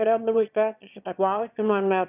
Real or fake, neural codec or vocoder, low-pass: fake; autoencoder, 22.05 kHz, a latent of 192 numbers a frame, VITS, trained on one speaker; 3.6 kHz